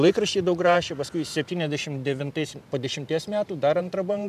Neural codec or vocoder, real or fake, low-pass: vocoder, 44.1 kHz, 128 mel bands every 512 samples, BigVGAN v2; fake; 14.4 kHz